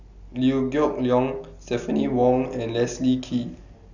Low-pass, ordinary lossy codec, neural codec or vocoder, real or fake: 7.2 kHz; none; none; real